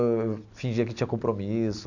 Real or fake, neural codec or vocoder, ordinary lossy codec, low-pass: real; none; none; 7.2 kHz